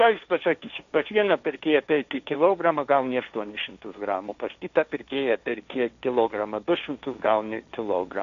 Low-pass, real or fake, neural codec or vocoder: 7.2 kHz; fake; codec, 16 kHz, 1.1 kbps, Voila-Tokenizer